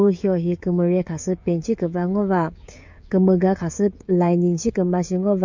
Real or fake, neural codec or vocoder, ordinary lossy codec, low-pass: fake; codec, 16 kHz, 16 kbps, FreqCodec, smaller model; MP3, 48 kbps; 7.2 kHz